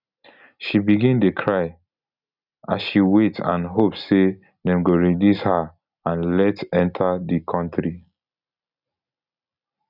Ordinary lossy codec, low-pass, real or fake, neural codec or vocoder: none; 5.4 kHz; real; none